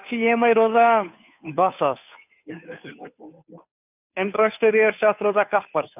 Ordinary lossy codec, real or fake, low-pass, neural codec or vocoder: none; fake; 3.6 kHz; codec, 16 kHz, 2 kbps, FunCodec, trained on Chinese and English, 25 frames a second